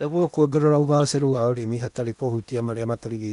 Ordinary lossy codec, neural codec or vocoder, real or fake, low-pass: MP3, 96 kbps; codec, 16 kHz in and 24 kHz out, 0.8 kbps, FocalCodec, streaming, 65536 codes; fake; 10.8 kHz